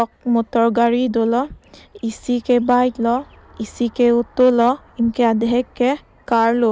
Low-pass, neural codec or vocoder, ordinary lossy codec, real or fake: none; none; none; real